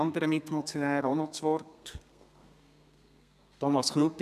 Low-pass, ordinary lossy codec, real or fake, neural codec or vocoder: 14.4 kHz; none; fake; codec, 32 kHz, 1.9 kbps, SNAC